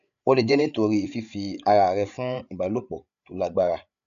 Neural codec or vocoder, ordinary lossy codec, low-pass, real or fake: codec, 16 kHz, 8 kbps, FreqCodec, larger model; none; 7.2 kHz; fake